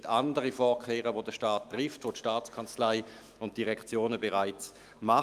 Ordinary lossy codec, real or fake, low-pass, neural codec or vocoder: Opus, 32 kbps; fake; 14.4 kHz; autoencoder, 48 kHz, 128 numbers a frame, DAC-VAE, trained on Japanese speech